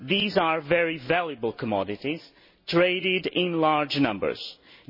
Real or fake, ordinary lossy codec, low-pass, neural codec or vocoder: real; MP3, 24 kbps; 5.4 kHz; none